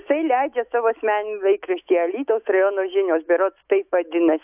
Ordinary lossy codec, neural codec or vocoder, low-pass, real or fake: AAC, 32 kbps; autoencoder, 48 kHz, 128 numbers a frame, DAC-VAE, trained on Japanese speech; 3.6 kHz; fake